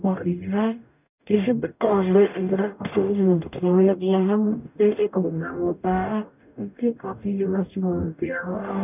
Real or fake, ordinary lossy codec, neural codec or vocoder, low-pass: fake; none; codec, 44.1 kHz, 0.9 kbps, DAC; 3.6 kHz